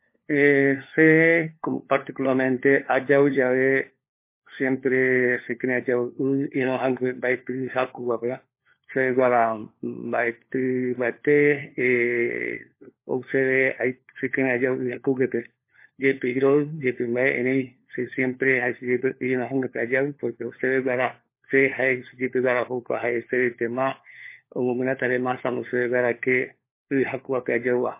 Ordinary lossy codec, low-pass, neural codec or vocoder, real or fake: MP3, 24 kbps; 3.6 kHz; codec, 16 kHz, 4 kbps, FunCodec, trained on LibriTTS, 50 frames a second; fake